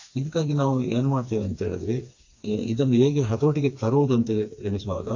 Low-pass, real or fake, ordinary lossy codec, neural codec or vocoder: 7.2 kHz; fake; AAC, 48 kbps; codec, 16 kHz, 2 kbps, FreqCodec, smaller model